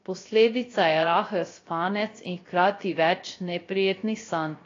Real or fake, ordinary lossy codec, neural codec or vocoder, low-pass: fake; AAC, 32 kbps; codec, 16 kHz, 0.3 kbps, FocalCodec; 7.2 kHz